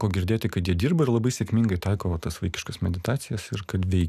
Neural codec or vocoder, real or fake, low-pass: none; real; 14.4 kHz